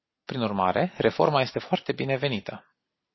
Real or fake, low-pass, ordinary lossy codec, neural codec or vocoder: real; 7.2 kHz; MP3, 24 kbps; none